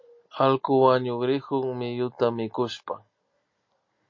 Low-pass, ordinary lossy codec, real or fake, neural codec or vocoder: 7.2 kHz; MP3, 32 kbps; real; none